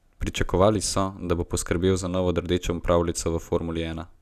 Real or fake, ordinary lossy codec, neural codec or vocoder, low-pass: real; none; none; 14.4 kHz